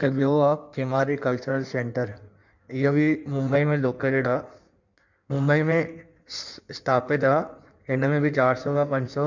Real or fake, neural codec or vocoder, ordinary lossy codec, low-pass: fake; codec, 16 kHz in and 24 kHz out, 1.1 kbps, FireRedTTS-2 codec; none; 7.2 kHz